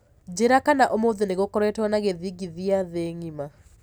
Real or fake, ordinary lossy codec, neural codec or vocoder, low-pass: real; none; none; none